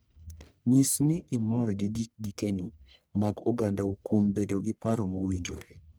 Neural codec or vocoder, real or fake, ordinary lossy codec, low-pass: codec, 44.1 kHz, 1.7 kbps, Pupu-Codec; fake; none; none